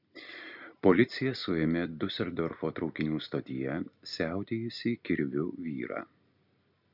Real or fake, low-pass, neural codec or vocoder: real; 5.4 kHz; none